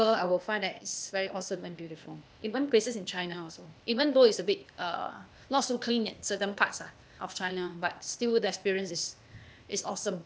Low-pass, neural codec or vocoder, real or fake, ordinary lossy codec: none; codec, 16 kHz, 0.8 kbps, ZipCodec; fake; none